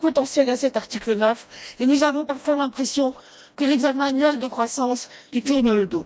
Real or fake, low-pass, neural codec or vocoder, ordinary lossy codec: fake; none; codec, 16 kHz, 1 kbps, FreqCodec, smaller model; none